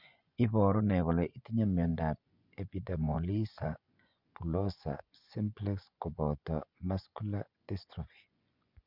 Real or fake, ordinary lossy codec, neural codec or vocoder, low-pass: fake; none; vocoder, 24 kHz, 100 mel bands, Vocos; 5.4 kHz